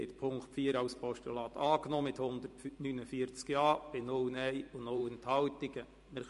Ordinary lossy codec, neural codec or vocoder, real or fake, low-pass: MP3, 48 kbps; vocoder, 44.1 kHz, 128 mel bands every 512 samples, BigVGAN v2; fake; 14.4 kHz